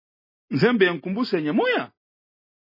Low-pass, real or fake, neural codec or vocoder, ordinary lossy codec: 5.4 kHz; real; none; MP3, 24 kbps